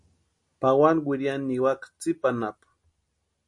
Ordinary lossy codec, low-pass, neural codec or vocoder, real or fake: MP3, 48 kbps; 10.8 kHz; none; real